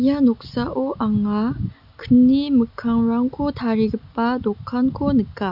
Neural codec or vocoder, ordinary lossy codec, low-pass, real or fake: none; none; 5.4 kHz; real